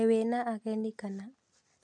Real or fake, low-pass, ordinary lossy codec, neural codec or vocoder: real; 9.9 kHz; MP3, 64 kbps; none